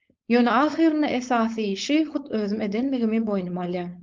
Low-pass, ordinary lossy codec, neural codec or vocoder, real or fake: 7.2 kHz; Opus, 24 kbps; codec, 16 kHz, 4.8 kbps, FACodec; fake